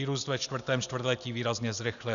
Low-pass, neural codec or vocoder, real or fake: 7.2 kHz; none; real